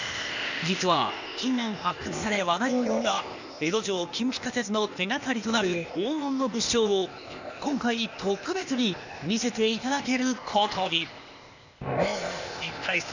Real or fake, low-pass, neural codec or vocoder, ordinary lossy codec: fake; 7.2 kHz; codec, 16 kHz, 0.8 kbps, ZipCodec; none